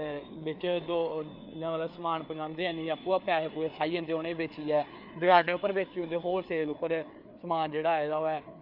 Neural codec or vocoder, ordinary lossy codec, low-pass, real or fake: codec, 16 kHz, 4 kbps, FreqCodec, larger model; AAC, 48 kbps; 5.4 kHz; fake